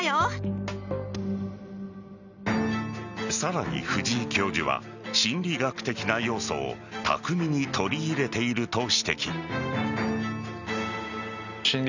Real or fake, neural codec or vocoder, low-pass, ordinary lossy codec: real; none; 7.2 kHz; none